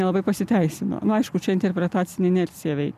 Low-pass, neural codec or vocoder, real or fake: 14.4 kHz; none; real